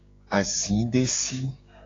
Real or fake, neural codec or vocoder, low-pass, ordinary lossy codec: fake; codec, 16 kHz, 6 kbps, DAC; 7.2 kHz; AAC, 32 kbps